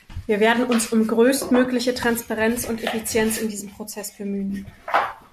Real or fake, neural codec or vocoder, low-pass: real; none; 14.4 kHz